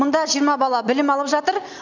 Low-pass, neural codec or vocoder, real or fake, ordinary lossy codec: 7.2 kHz; none; real; none